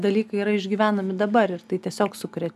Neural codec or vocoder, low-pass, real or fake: none; 14.4 kHz; real